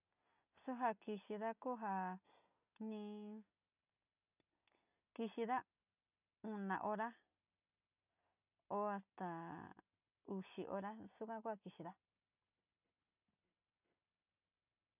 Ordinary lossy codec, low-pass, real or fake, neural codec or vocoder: none; 3.6 kHz; real; none